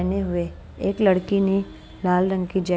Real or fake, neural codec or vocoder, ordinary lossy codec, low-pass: real; none; none; none